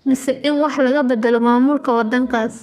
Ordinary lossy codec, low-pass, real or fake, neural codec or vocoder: none; 14.4 kHz; fake; codec, 32 kHz, 1.9 kbps, SNAC